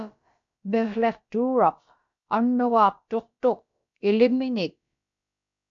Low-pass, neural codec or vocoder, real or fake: 7.2 kHz; codec, 16 kHz, about 1 kbps, DyCAST, with the encoder's durations; fake